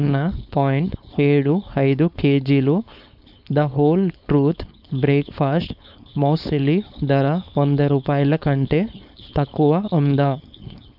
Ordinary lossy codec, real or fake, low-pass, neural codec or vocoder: none; fake; 5.4 kHz; codec, 16 kHz, 4.8 kbps, FACodec